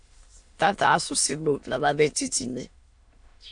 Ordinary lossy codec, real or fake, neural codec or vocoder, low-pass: AAC, 64 kbps; fake; autoencoder, 22.05 kHz, a latent of 192 numbers a frame, VITS, trained on many speakers; 9.9 kHz